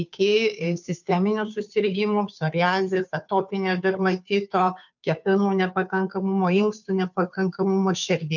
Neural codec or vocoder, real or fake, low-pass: codec, 16 kHz, 2 kbps, FunCodec, trained on Chinese and English, 25 frames a second; fake; 7.2 kHz